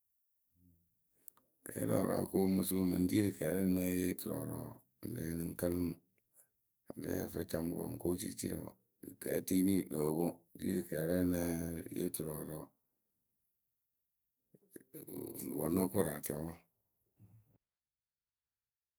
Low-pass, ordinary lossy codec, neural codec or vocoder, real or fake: none; none; codec, 44.1 kHz, 2.6 kbps, SNAC; fake